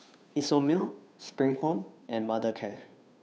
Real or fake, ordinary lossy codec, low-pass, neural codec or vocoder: fake; none; none; codec, 16 kHz, 2 kbps, FunCodec, trained on Chinese and English, 25 frames a second